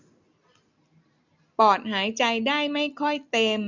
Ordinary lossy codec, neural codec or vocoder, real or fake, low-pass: none; none; real; 7.2 kHz